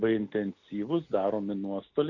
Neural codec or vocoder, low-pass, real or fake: none; 7.2 kHz; real